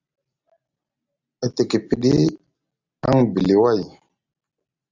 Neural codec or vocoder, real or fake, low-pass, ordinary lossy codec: none; real; 7.2 kHz; Opus, 64 kbps